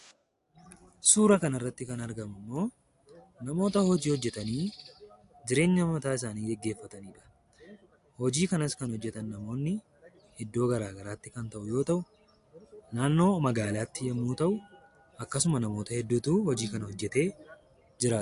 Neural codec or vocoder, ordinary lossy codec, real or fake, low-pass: none; AAC, 64 kbps; real; 10.8 kHz